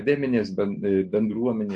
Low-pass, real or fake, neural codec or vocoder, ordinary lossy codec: 10.8 kHz; real; none; AAC, 64 kbps